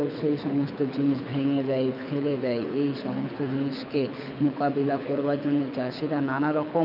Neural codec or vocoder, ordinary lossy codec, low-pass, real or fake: codec, 24 kHz, 6 kbps, HILCodec; none; 5.4 kHz; fake